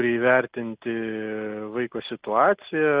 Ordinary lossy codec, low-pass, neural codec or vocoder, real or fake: Opus, 24 kbps; 3.6 kHz; none; real